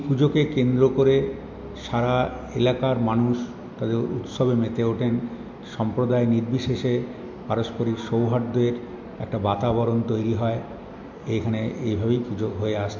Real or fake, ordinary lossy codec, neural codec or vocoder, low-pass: real; MP3, 48 kbps; none; 7.2 kHz